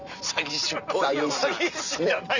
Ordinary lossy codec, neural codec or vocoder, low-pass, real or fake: none; none; 7.2 kHz; real